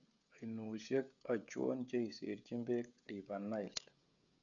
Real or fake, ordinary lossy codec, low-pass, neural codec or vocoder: fake; none; 7.2 kHz; codec, 16 kHz, 16 kbps, FreqCodec, smaller model